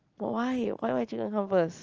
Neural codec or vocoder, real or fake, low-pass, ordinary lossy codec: none; real; 7.2 kHz; Opus, 24 kbps